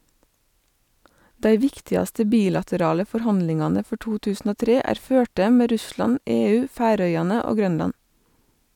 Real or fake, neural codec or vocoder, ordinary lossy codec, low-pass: real; none; none; 19.8 kHz